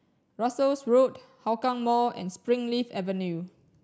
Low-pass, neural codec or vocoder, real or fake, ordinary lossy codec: none; none; real; none